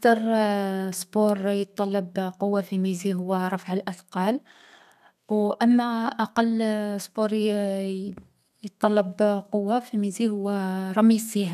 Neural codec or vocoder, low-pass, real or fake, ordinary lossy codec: codec, 32 kHz, 1.9 kbps, SNAC; 14.4 kHz; fake; none